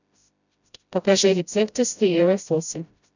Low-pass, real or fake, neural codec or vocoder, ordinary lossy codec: 7.2 kHz; fake; codec, 16 kHz, 0.5 kbps, FreqCodec, smaller model; none